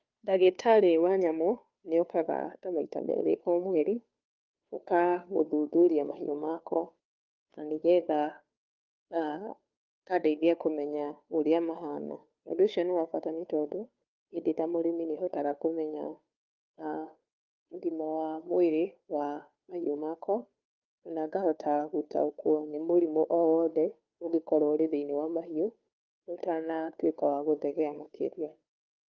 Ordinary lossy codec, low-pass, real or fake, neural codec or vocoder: Opus, 24 kbps; 7.2 kHz; fake; codec, 16 kHz, 2 kbps, FunCodec, trained on Chinese and English, 25 frames a second